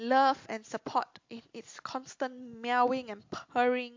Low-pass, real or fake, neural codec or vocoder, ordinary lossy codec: 7.2 kHz; real; none; MP3, 48 kbps